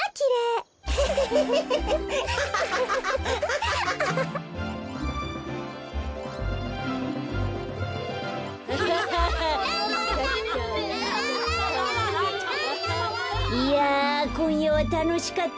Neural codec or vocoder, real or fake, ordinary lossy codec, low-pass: none; real; none; none